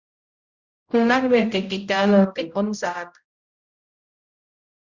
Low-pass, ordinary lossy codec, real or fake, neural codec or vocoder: 7.2 kHz; Opus, 64 kbps; fake; codec, 16 kHz, 0.5 kbps, X-Codec, HuBERT features, trained on general audio